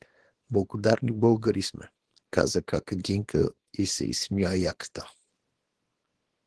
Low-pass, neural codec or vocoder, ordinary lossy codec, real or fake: 10.8 kHz; codec, 24 kHz, 0.9 kbps, WavTokenizer, small release; Opus, 16 kbps; fake